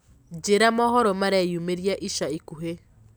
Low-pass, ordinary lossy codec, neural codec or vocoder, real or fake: none; none; none; real